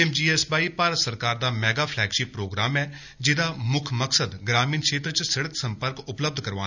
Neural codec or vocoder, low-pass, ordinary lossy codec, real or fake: none; 7.2 kHz; none; real